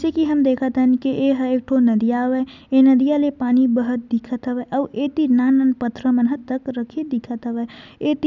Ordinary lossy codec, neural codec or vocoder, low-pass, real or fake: none; none; 7.2 kHz; real